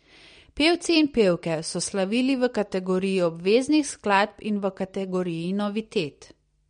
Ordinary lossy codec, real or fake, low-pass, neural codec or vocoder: MP3, 48 kbps; real; 9.9 kHz; none